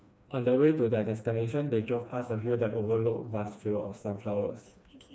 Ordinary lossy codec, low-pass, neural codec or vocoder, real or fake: none; none; codec, 16 kHz, 2 kbps, FreqCodec, smaller model; fake